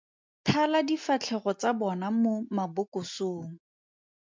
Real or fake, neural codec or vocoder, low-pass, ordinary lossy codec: real; none; 7.2 kHz; MP3, 64 kbps